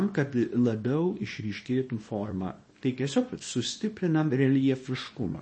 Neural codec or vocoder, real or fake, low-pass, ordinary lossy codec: codec, 24 kHz, 0.9 kbps, WavTokenizer, small release; fake; 9.9 kHz; MP3, 32 kbps